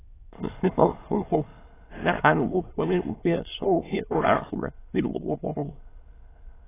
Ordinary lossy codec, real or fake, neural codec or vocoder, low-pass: AAC, 16 kbps; fake; autoencoder, 22.05 kHz, a latent of 192 numbers a frame, VITS, trained on many speakers; 3.6 kHz